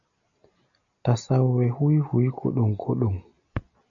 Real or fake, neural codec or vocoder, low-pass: real; none; 7.2 kHz